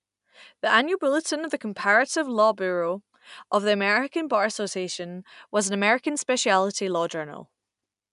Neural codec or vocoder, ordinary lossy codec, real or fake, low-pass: none; none; real; 10.8 kHz